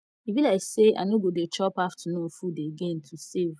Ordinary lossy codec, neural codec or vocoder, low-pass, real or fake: none; none; none; real